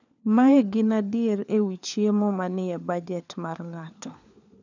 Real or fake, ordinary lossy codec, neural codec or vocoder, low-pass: fake; none; codec, 16 kHz, 4 kbps, FunCodec, trained on LibriTTS, 50 frames a second; 7.2 kHz